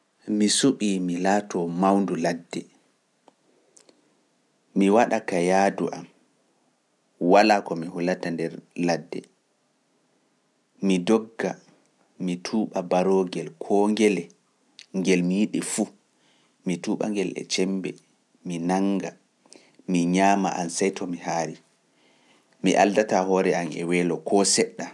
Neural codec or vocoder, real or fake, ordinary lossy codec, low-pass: none; real; none; none